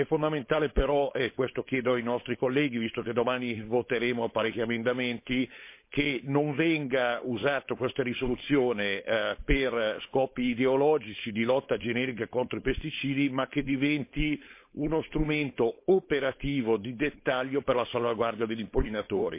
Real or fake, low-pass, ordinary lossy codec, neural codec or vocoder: fake; 3.6 kHz; MP3, 24 kbps; codec, 16 kHz, 4.8 kbps, FACodec